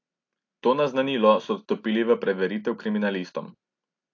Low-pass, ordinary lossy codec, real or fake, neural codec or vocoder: 7.2 kHz; AAC, 48 kbps; real; none